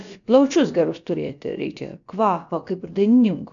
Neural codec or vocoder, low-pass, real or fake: codec, 16 kHz, about 1 kbps, DyCAST, with the encoder's durations; 7.2 kHz; fake